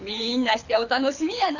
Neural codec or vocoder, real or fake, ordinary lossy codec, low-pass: codec, 24 kHz, 6 kbps, HILCodec; fake; none; 7.2 kHz